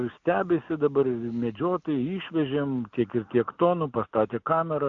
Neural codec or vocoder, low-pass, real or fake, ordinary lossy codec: none; 7.2 kHz; real; AAC, 64 kbps